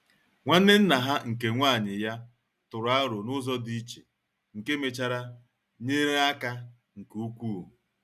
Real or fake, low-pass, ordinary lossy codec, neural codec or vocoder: real; 14.4 kHz; none; none